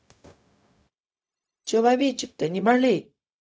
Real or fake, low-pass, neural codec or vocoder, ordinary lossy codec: fake; none; codec, 16 kHz, 0.4 kbps, LongCat-Audio-Codec; none